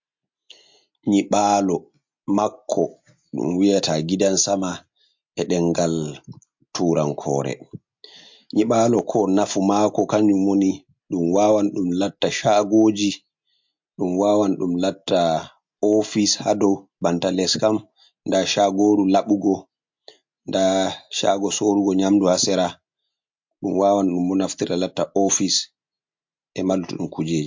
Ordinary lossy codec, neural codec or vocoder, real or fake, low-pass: MP3, 48 kbps; none; real; 7.2 kHz